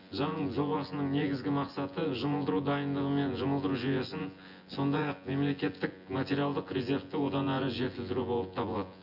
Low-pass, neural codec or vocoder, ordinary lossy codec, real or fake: 5.4 kHz; vocoder, 24 kHz, 100 mel bands, Vocos; none; fake